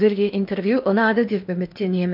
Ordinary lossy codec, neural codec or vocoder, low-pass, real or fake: none; codec, 16 kHz in and 24 kHz out, 0.8 kbps, FocalCodec, streaming, 65536 codes; 5.4 kHz; fake